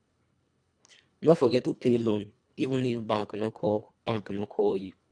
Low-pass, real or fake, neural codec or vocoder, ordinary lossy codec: 9.9 kHz; fake; codec, 24 kHz, 1.5 kbps, HILCodec; none